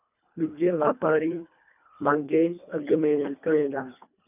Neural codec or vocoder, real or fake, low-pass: codec, 24 kHz, 1.5 kbps, HILCodec; fake; 3.6 kHz